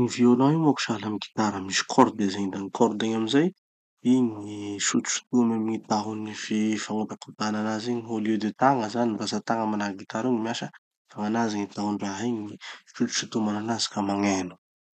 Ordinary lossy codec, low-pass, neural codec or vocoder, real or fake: none; 14.4 kHz; none; real